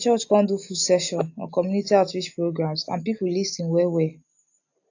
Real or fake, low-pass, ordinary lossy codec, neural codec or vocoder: real; 7.2 kHz; AAC, 48 kbps; none